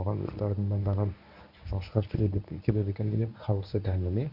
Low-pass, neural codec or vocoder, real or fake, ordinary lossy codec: 5.4 kHz; codec, 24 kHz, 0.9 kbps, WavTokenizer, medium speech release version 1; fake; none